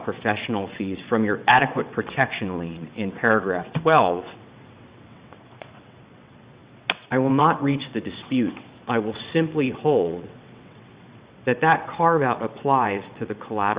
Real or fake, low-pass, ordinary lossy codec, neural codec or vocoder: fake; 3.6 kHz; Opus, 64 kbps; vocoder, 22.05 kHz, 80 mel bands, WaveNeXt